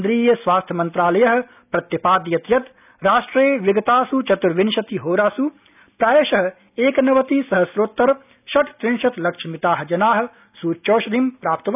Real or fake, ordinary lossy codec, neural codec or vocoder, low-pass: real; none; none; 3.6 kHz